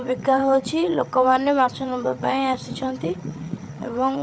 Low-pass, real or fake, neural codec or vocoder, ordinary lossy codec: none; fake; codec, 16 kHz, 8 kbps, FreqCodec, larger model; none